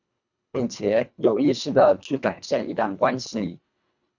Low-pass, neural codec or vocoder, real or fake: 7.2 kHz; codec, 24 kHz, 1.5 kbps, HILCodec; fake